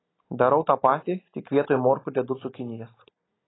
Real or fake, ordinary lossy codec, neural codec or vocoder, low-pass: real; AAC, 16 kbps; none; 7.2 kHz